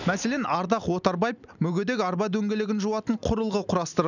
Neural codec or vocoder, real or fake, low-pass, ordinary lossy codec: none; real; 7.2 kHz; none